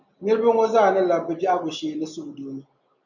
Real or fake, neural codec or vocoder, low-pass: real; none; 7.2 kHz